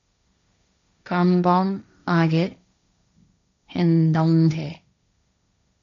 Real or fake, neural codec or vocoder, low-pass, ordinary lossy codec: fake; codec, 16 kHz, 1.1 kbps, Voila-Tokenizer; 7.2 kHz; MP3, 64 kbps